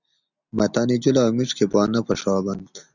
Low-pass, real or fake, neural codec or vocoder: 7.2 kHz; real; none